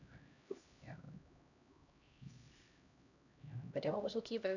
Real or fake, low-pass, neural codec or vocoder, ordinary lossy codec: fake; 7.2 kHz; codec, 16 kHz, 1 kbps, X-Codec, HuBERT features, trained on LibriSpeech; none